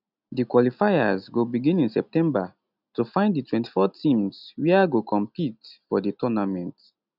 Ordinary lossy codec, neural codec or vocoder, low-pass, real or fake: none; none; 5.4 kHz; real